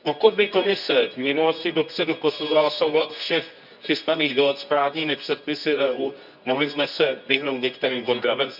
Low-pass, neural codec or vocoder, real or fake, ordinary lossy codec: 5.4 kHz; codec, 24 kHz, 0.9 kbps, WavTokenizer, medium music audio release; fake; none